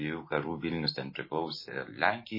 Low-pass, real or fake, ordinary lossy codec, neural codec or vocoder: 5.4 kHz; real; MP3, 24 kbps; none